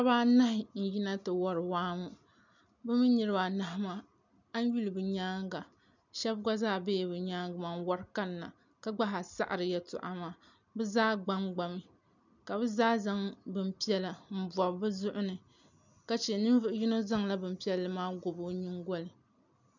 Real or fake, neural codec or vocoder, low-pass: real; none; 7.2 kHz